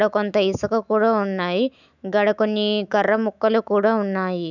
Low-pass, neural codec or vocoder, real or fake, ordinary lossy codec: 7.2 kHz; autoencoder, 48 kHz, 128 numbers a frame, DAC-VAE, trained on Japanese speech; fake; none